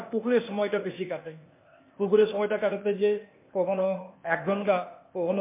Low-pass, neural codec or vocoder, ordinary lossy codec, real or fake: 3.6 kHz; codec, 16 kHz, 0.8 kbps, ZipCodec; MP3, 24 kbps; fake